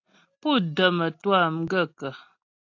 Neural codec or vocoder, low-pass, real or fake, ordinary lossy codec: none; 7.2 kHz; real; AAC, 48 kbps